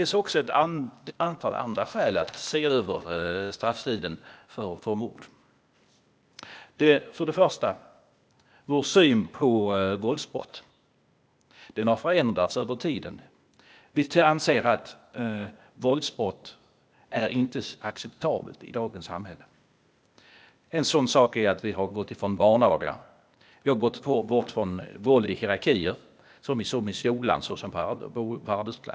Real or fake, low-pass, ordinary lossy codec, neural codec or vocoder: fake; none; none; codec, 16 kHz, 0.8 kbps, ZipCodec